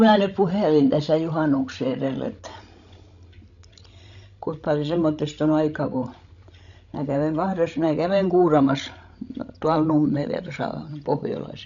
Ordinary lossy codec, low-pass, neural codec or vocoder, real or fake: none; 7.2 kHz; codec, 16 kHz, 16 kbps, FreqCodec, larger model; fake